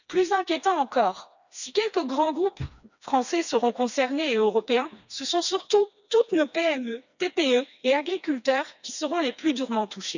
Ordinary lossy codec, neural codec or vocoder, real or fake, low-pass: none; codec, 16 kHz, 2 kbps, FreqCodec, smaller model; fake; 7.2 kHz